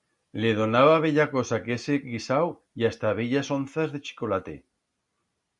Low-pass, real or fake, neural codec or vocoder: 10.8 kHz; real; none